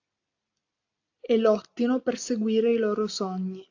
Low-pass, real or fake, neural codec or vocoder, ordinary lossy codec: 7.2 kHz; real; none; AAC, 48 kbps